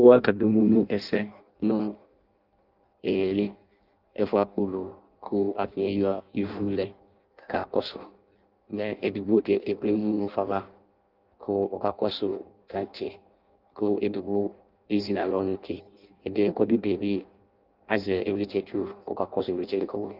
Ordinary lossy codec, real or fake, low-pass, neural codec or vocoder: Opus, 24 kbps; fake; 5.4 kHz; codec, 16 kHz in and 24 kHz out, 0.6 kbps, FireRedTTS-2 codec